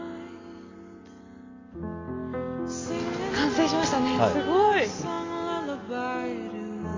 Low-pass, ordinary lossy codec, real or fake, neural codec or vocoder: 7.2 kHz; AAC, 32 kbps; real; none